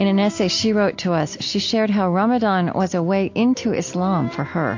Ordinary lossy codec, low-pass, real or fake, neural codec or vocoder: AAC, 48 kbps; 7.2 kHz; real; none